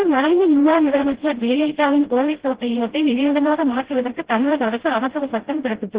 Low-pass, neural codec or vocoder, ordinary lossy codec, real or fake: 3.6 kHz; codec, 16 kHz, 0.5 kbps, FreqCodec, smaller model; Opus, 16 kbps; fake